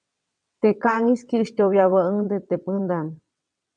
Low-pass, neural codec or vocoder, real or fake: 9.9 kHz; vocoder, 22.05 kHz, 80 mel bands, WaveNeXt; fake